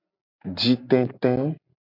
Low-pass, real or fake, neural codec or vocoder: 5.4 kHz; real; none